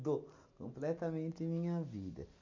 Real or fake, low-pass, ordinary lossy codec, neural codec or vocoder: real; 7.2 kHz; MP3, 48 kbps; none